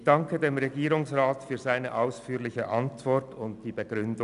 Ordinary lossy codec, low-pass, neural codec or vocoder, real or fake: none; 10.8 kHz; none; real